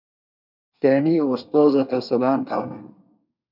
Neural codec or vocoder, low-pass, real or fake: codec, 24 kHz, 1 kbps, SNAC; 5.4 kHz; fake